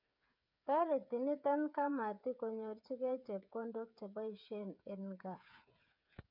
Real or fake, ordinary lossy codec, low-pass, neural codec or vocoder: fake; none; 5.4 kHz; codec, 16 kHz, 8 kbps, FreqCodec, smaller model